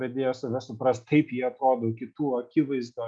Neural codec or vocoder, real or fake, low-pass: none; real; 9.9 kHz